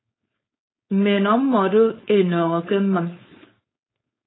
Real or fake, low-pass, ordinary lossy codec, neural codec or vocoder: fake; 7.2 kHz; AAC, 16 kbps; codec, 16 kHz, 4.8 kbps, FACodec